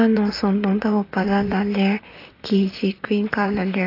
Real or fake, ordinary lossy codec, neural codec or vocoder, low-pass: fake; AAC, 32 kbps; vocoder, 44.1 kHz, 128 mel bands, Pupu-Vocoder; 5.4 kHz